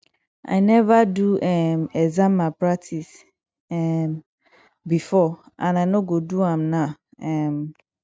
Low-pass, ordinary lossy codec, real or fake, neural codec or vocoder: none; none; real; none